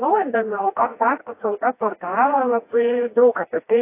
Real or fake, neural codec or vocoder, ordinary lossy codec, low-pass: fake; codec, 16 kHz, 1 kbps, FreqCodec, smaller model; AAC, 24 kbps; 3.6 kHz